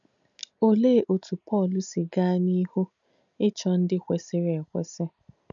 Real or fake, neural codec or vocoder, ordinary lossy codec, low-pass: real; none; none; 7.2 kHz